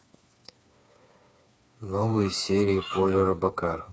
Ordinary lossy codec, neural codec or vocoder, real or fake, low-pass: none; codec, 16 kHz, 4 kbps, FreqCodec, smaller model; fake; none